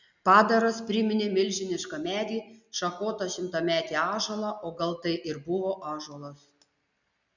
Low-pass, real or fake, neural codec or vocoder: 7.2 kHz; real; none